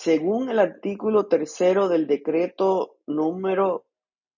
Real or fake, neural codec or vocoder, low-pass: real; none; 7.2 kHz